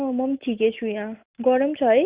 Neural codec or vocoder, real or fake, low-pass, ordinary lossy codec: none; real; 3.6 kHz; Opus, 64 kbps